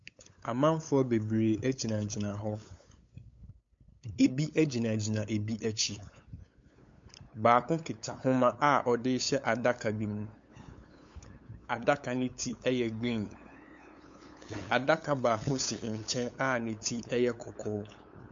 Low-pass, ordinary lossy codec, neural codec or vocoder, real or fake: 7.2 kHz; MP3, 48 kbps; codec, 16 kHz, 8 kbps, FunCodec, trained on LibriTTS, 25 frames a second; fake